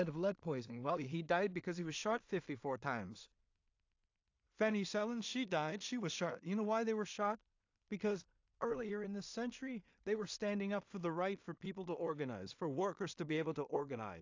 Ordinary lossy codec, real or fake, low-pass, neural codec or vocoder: AAC, 48 kbps; fake; 7.2 kHz; codec, 16 kHz in and 24 kHz out, 0.4 kbps, LongCat-Audio-Codec, two codebook decoder